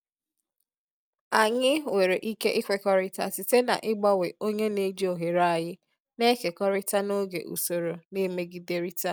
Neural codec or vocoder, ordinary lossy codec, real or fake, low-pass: none; none; real; none